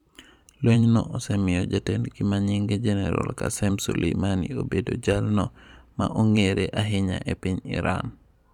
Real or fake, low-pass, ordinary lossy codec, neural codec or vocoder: fake; 19.8 kHz; none; vocoder, 44.1 kHz, 128 mel bands every 512 samples, BigVGAN v2